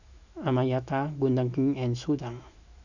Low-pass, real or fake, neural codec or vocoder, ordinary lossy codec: 7.2 kHz; fake; autoencoder, 48 kHz, 128 numbers a frame, DAC-VAE, trained on Japanese speech; none